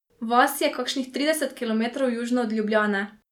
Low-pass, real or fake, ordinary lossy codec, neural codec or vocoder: 19.8 kHz; real; none; none